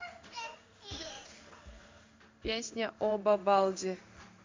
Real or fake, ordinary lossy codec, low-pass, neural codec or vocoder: fake; MP3, 64 kbps; 7.2 kHz; codec, 16 kHz in and 24 kHz out, 1 kbps, XY-Tokenizer